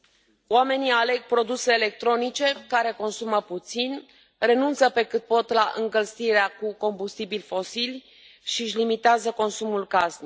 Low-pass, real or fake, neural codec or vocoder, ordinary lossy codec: none; real; none; none